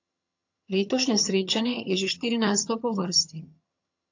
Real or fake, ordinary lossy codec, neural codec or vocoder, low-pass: fake; AAC, 48 kbps; vocoder, 22.05 kHz, 80 mel bands, HiFi-GAN; 7.2 kHz